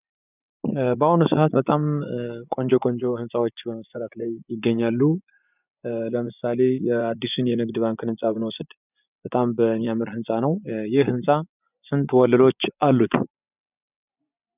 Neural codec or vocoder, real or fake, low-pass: vocoder, 24 kHz, 100 mel bands, Vocos; fake; 3.6 kHz